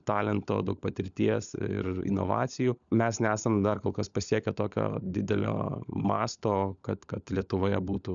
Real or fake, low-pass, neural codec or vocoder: fake; 7.2 kHz; codec, 16 kHz, 16 kbps, FunCodec, trained on LibriTTS, 50 frames a second